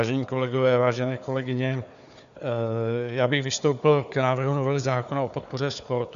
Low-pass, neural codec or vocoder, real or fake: 7.2 kHz; codec, 16 kHz, 4 kbps, FunCodec, trained on Chinese and English, 50 frames a second; fake